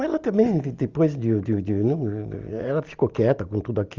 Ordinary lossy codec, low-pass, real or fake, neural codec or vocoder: Opus, 32 kbps; 7.2 kHz; real; none